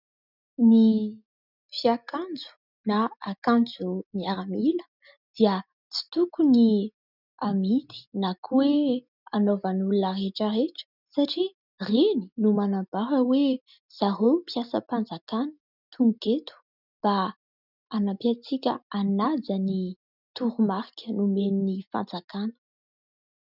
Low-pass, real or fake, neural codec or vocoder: 5.4 kHz; real; none